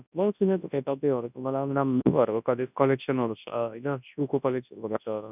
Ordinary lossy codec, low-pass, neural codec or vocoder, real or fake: none; 3.6 kHz; codec, 24 kHz, 0.9 kbps, WavTokenizer, large speech release; fake